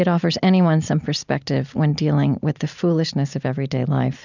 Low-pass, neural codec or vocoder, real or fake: 7.2 kHz; none; real